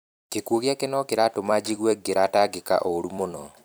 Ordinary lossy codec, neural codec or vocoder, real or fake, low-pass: none; vocoder, 44.1 kHz, 128 mel bands every 256 samples, BigVGAN v2; fake; none